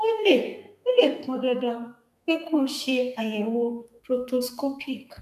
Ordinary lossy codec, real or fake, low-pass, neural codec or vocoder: none; fake; 14.4 kHz; codec, 32 kHz, 1.9 kbps, SNAC